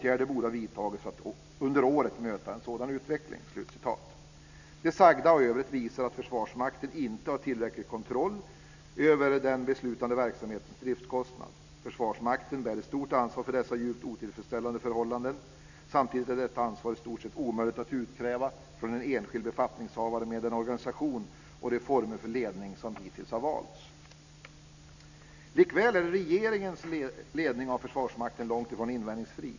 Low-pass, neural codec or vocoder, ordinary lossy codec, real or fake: 7.2 kHz; none; none; real